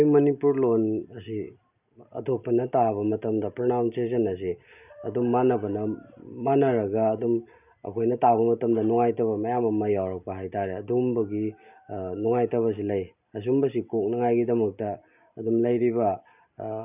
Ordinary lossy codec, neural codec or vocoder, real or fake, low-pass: none; none; real; 3.6 kHz